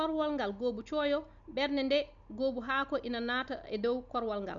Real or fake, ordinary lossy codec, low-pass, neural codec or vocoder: real; none; 7.2 kHz; none